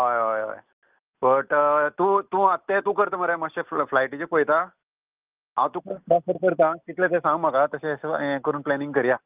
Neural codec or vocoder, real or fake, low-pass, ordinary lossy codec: none; real; 3.6 kHz; Opus, 32 kbps